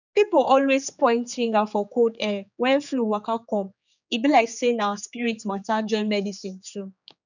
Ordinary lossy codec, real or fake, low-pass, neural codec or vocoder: none; fake; 7.2 kHz; codec, 16 kHz, 4 kbps, X-Codec, HuBERT features, trained on general audio